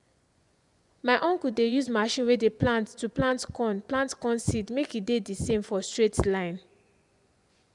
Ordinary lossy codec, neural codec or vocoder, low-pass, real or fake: none; vocoder, 24 kHz, 100 mel bands, Vocos; 10.8 kHz; fake